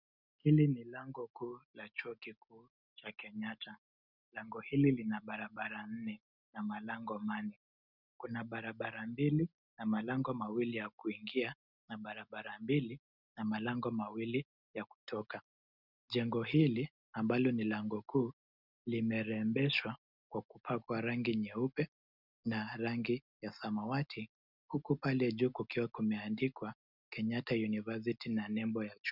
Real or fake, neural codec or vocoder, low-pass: real; none; 5.4 kHz